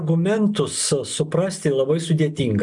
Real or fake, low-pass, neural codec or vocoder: real; 10.8 kHz; none